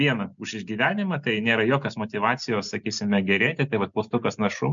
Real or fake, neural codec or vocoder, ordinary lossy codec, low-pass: real; none; MP3, 64 kbps; 7.2 kHz